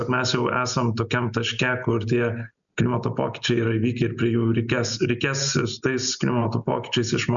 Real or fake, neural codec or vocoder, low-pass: real; none; 7.2 kHz